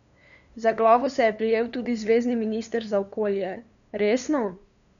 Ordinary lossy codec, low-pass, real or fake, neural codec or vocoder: none; 7.2 kHz; fake; codec, 16 kHz, 2 kbps, FunCodec, trained on LibriTTS, 25 frames a second